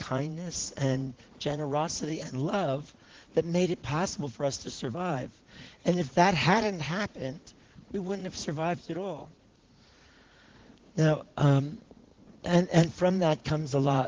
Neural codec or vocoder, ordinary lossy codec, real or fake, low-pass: vocoder, 44.1 kHz, 80 mel bands, Vocos; Opus, 16 kbps; fake; 7.2 kHz